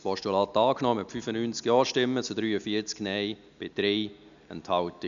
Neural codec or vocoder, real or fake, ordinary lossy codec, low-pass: none; real; none; 7.2 kHz